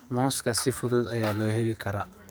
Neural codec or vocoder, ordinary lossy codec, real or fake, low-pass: codec, 44.1 kHz, 2.6 kbps, SNAC; none; fake; none